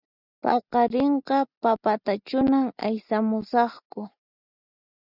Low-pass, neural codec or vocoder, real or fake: 5.4 kHz; none; real